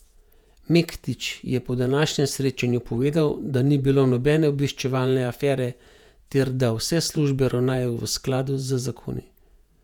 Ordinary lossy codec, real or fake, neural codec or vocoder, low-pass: none; fake; vocoder, 48 kHz, 128 mel bands, Vocos; 19.8 kHz